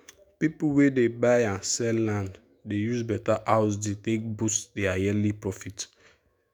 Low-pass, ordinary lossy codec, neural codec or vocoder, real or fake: none; none; autoencoder, 48 kHz, 128 numbers a frame, DAC-VAE, trained on Japanese speech; fake